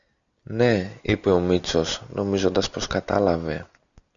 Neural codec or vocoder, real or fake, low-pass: none; real; 7.2 kHz